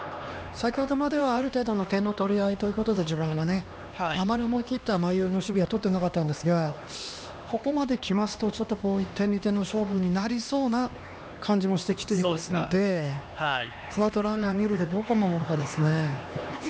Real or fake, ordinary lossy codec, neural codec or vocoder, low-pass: fake; none; codec, 16 kHz, 2 kbps, X-Codec, HuBERT features, trained on LibriSpeech; none